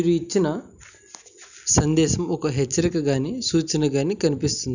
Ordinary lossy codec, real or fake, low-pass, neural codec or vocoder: none; real; 7.2 kHz; none